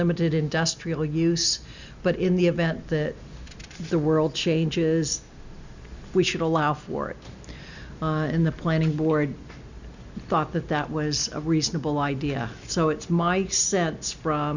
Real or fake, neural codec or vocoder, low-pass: real; none; 7.2 kHz